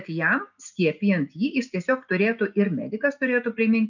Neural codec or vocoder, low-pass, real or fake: none; 7.2 kHz; real